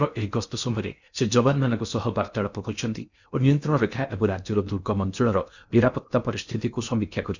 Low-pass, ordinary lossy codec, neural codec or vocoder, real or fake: 7.2 kHz; none; codec, 16 kHz in and 24 kHz out, 0.8 kbps, FocalCodec, streaming, 65536 codes; fake